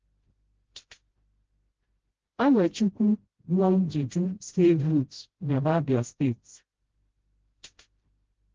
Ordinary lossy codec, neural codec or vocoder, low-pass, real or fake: Opus, 16 kbps; codec, 16 kHz, 0.5 kbps, FreqCodec, smaller model; 7.2 kHz; fake